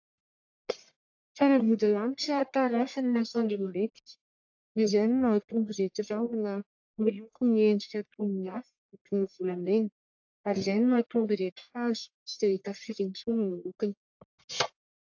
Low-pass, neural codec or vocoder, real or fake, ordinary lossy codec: 7.2 kHz; codec, 44.1 kHz, 1.7 kbps, Pupu-Codec; fake; AAC, 48 kbps